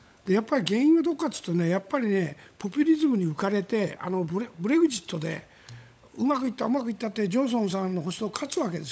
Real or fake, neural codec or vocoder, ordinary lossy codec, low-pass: fake; codec, 16 kHz, 16 kbps, FunCodec, trained on LibriTTS, 50 frames a second; none; none